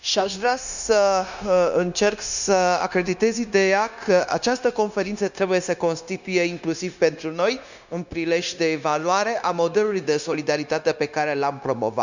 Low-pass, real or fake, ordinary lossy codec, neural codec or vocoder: 7.2 kHz; fake; none; codec, 16 kHz, 0.9 kbps, LongCat-Audio-Codec